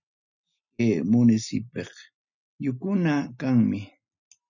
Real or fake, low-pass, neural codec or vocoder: real; 7.2 kHz; none